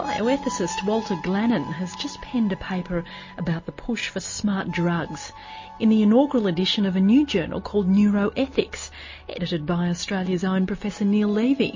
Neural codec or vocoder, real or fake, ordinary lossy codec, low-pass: none; real; MP3, 32 kbps; 7.2 kHz